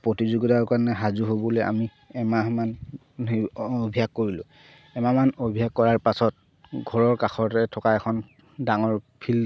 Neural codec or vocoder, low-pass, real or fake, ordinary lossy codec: none; none; real; none